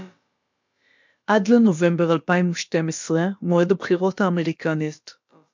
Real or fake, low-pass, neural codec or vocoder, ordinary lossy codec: fake; 7.2 kHz; codec, 16 kHz, about 1 kbps, DyCAST, with the encoder's durations; MP3, 64 kbps